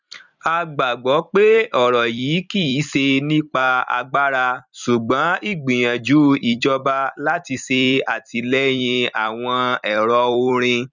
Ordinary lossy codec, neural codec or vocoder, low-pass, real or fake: none; vocoder, 44.1 kHz, 128 mel bands every 256 samples, BigVGAN v2; 7.2 kHz; fake